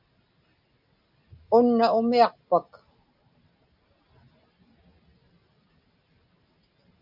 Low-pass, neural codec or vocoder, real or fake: 5.4 kHz; none; real